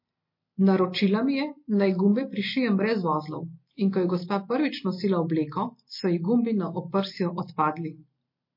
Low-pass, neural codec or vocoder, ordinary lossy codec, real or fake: 5.4 kHz; none; MP3, 32 kbps; real